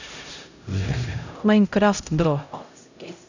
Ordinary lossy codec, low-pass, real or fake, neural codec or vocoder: none; 7.2 kHz; fake; codec, 16 kHz, 0.5 kbps, X-Codec, HuBERT features, trained on LibriSpeech